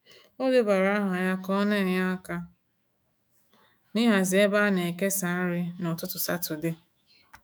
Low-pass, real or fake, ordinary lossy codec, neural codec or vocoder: none; fake; none; autoencoder, 48 kHz, 128 numbers a frame, DAC-VAE, trained on Japanese speech